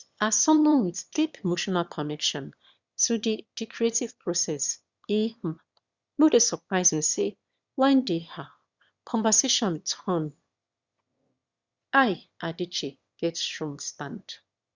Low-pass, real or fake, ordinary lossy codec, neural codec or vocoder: 7.2 kHz; fake; Opus, 64 kbps; autoencoder, 22.05 kHz, a latent of 192 numbers a frame, VITS, trained on one speaker